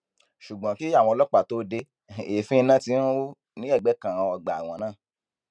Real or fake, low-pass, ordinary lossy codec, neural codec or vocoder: real; 9.9 kHz; none; none